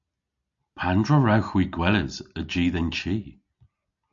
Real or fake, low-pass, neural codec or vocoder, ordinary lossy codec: real; 7.2 kHz; none; AAC, 64 kbps